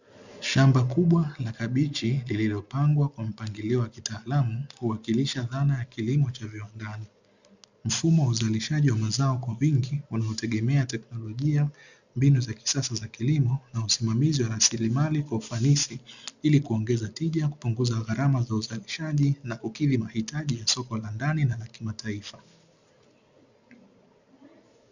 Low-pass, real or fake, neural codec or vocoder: 7.2 kHz; real; none